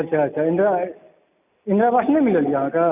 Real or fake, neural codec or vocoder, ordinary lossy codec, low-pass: real; none; none; 3.6 kHz